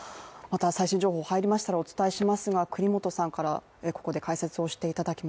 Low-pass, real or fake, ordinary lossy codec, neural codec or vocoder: none; real; none; none